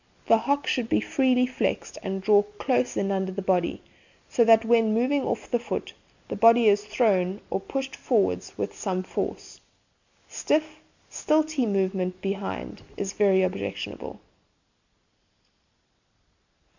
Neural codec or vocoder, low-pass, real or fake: none; 7.2 kHz; real